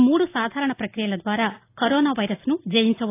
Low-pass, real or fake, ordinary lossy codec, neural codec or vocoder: 3.6 kHz; real; AAC, 24 kbps; none